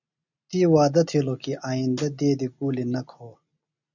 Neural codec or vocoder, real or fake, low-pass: none; real; 7.2 kHz